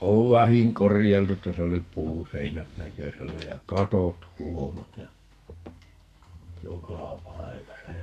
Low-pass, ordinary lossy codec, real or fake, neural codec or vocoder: 14.4 kHz; none; fake; codec, 44.1 kHz, 2.6 kbps, SNAC